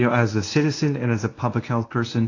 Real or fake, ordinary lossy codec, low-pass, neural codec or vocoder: fake; AAC, 32 kbps; 7.2 kHz; codec, 24 kHz, 0.9 kbps, WavTokenizer, medium speech release version 1